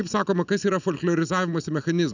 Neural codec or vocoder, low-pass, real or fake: none; 7.2 kHz; real